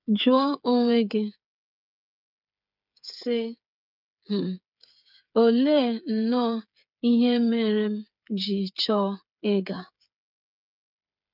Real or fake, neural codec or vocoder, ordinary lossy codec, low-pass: fake; codec, 16 kHz, 8 kbps, FreqCodec, smaller model; none; 5.4 kHz